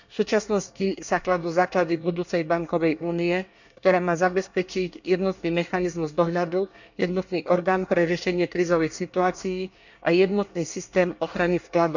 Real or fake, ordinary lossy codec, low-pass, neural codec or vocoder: fake; none; 7.2 kHz; codec, 24 kHz, 1 kbps, SNAC